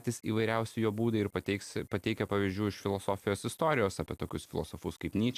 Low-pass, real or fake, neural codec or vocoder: 14.4 kHz; real; none